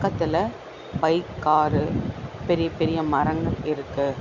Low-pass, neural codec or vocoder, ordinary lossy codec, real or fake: 7.2 kHz; none; none; real